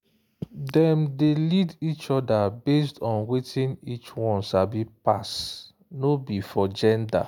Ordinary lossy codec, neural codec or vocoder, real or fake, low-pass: none; none; real; 19.8 kHz